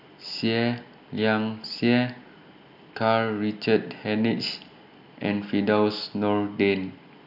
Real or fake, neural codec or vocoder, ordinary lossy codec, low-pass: real; none; none; 5.4 kHz